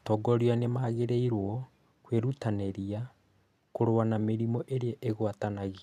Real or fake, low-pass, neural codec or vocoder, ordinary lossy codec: real; 14.4 kHz; none; none